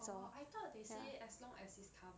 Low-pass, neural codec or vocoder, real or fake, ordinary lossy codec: none; none; real; none